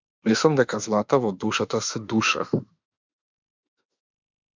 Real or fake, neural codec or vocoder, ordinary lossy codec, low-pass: fake; autoencoder, 48 kHz, 32 numbers a frame, DAC-VAE, trained on Japanese speech; MP3, 64 kbps; 7.2 kHz